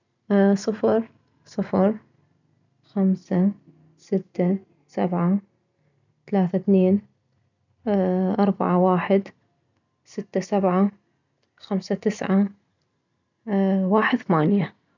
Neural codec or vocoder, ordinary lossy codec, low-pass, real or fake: none; none; 7.2 kHz; real